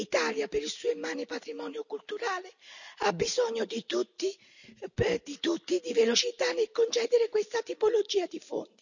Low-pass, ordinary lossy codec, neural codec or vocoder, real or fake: 7.2 kHz; none; none; real